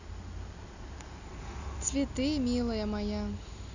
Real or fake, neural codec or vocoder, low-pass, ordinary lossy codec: real; none; 7.2 kHz; none